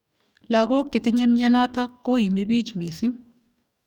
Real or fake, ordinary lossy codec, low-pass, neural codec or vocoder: fake; none; 19.8 kHz; codec, 44.1 kHz, 2.6 kbps, DAC